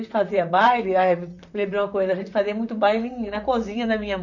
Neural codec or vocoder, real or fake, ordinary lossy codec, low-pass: vocoder, 44.1 kHz, 128 mel bands, Pupu-Vocoder; fake; none; 7.2 kHz